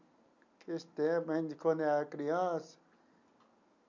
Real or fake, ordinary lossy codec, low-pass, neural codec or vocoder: real; none; 7.2 kHz; none